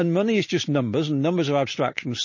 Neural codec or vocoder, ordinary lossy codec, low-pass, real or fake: none; MP3, 32 kbps; 7.2 kHz; real